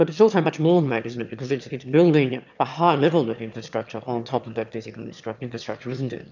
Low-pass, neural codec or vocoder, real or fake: 7.2 kHz; autoencoder, 22.05 kHz, a latent of 192 numbers a frame, VITS, trained on one speaker; fake